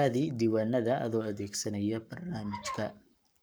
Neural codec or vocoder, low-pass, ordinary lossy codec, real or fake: codec, 44.1 kHz, 7.8 kbps, Pupu-Codec; none; none; fake